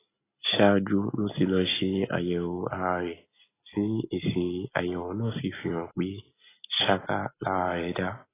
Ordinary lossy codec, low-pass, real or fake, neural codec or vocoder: AAC, 16 kbps; 3.6 kHz; real; none